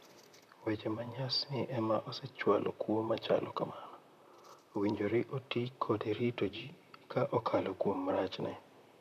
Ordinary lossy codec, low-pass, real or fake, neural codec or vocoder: none; 14.4 kHz; fake; vocoder, 44.1 kHz, 128 mel bands, Pupu-Vocoder